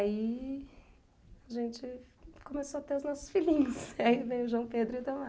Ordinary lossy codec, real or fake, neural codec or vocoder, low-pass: none; real; none; none